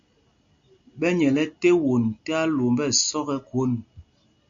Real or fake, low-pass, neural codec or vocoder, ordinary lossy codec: real; 7.2 kHz; none; MP3, 64 kbps